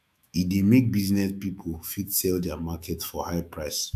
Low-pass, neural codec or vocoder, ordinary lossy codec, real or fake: 14.4 kHz; autoencoder, 48 kHz, 128 numbers a frame, DAC-VAE, trained on Japanese speech; none; fake